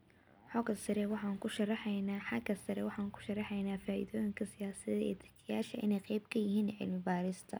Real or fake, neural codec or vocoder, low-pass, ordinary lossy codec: real; none; none; none